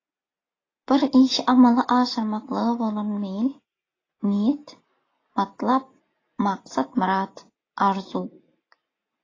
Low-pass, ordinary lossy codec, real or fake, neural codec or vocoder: 7.2 kHz; AAC, 32 kbps; real; none